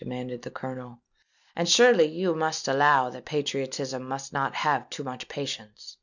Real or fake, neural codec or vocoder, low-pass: real; none; 7.2 kHz